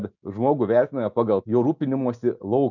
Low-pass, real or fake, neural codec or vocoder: 7.2 kHz; real; none